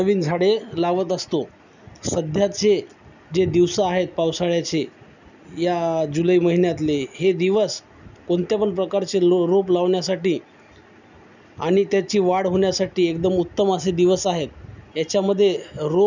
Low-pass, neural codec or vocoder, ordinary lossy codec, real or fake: 7.2 kHz; none; none; real